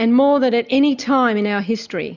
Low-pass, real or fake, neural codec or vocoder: 7.2 kHz; real; none